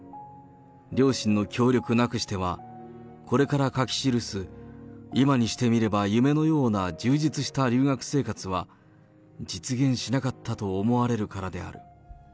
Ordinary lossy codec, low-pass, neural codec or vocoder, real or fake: none; none; none; real